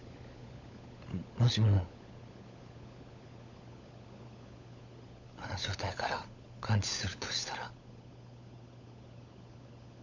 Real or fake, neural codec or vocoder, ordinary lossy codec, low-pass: fake; codec, 16 kHz, 16 kbps, FunCodec, trained on LibriTTS, 50 frames a second; none; 7.2 kHz